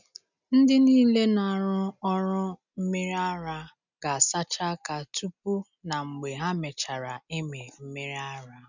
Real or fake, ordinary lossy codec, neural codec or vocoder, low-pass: real; none; none; 7.2 kHz